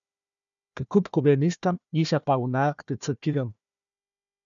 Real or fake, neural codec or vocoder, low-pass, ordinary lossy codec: fake; codec, 16 kHz, 1 kbps, FunCodec, trained on Chinese and English, 50 frames a second; 7.2 kHz; MP3, 96 kbps